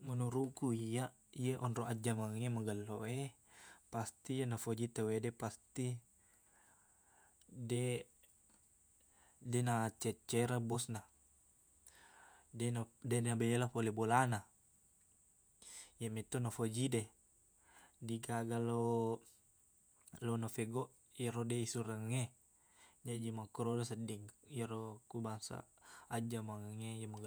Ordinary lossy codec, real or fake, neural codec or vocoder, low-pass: none; fake; vocoder, 48 kHz, 128 mel bands, Vocos; none